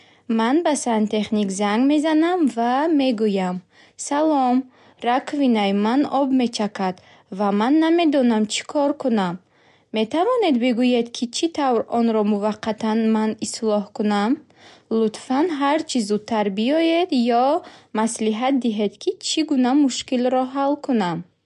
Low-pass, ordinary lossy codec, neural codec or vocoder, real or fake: 10.8 kHz; MP3, 64 kbps; none; real